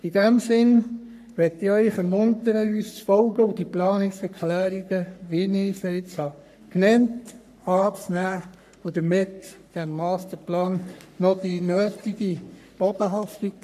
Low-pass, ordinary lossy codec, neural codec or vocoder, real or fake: 14.4 kHz; none; codec, 44.1 kHz, 3.4 kbps, Pupu-Codec; fake